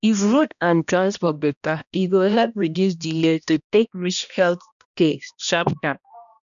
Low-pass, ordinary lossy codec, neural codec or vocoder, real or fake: 7.2 kHz; none; codec, 16 kHz, 1 kbps, X-Codec, HuBERT features, trained on balanced general audio; fake